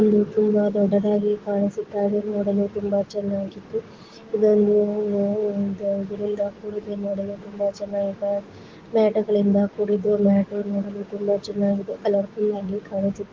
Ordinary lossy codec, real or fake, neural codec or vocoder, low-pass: Opus, 16 kbps; fake; codec, 16 kHz, 6 kbps, DAC; 7.2 kHz